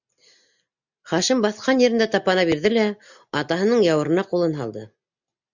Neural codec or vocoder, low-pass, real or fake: none; 7.2 kHz; real